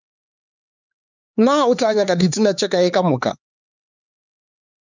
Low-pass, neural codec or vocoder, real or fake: 7.2 kHz; codec, 16 kHz, 4 kbps, X-Codec, HuBERT features, trained on LibriSpeech; fake